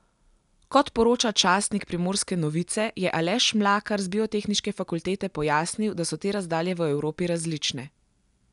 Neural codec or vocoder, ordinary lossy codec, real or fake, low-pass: none; none; real; 10.8 kHz